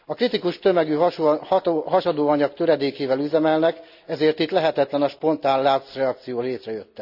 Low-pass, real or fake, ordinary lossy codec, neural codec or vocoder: 5.4 kHz; real; none; none